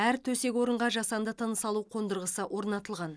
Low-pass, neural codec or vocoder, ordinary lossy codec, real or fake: none; none; none; real